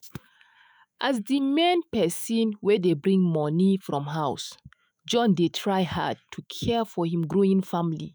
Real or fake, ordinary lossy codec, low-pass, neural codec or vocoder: fake; none; none; autoencoder, 48 kHz, 128 numbers a frame, DAC-VAE, trained on Japanese speech